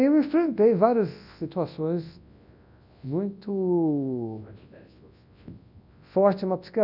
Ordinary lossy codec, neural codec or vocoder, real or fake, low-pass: none; codec, 24 kHz, 0.9 kbps, WavTokenizer, large speech release; fake; 5.4 kHz